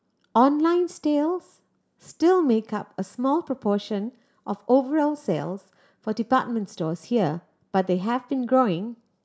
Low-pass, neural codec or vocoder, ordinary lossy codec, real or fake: none; none; none; real